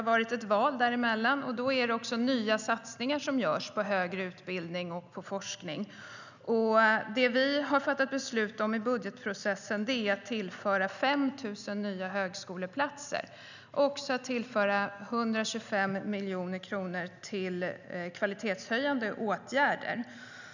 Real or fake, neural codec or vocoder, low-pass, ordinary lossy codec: real; none; 7.2 kHz; none